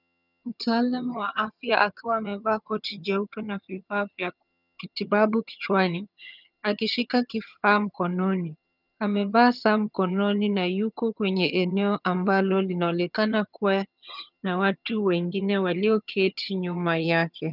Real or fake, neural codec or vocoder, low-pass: fake; vocoder, 22.05 kHz, 80 mel bands, HiFi-GAN; 5.4 kHz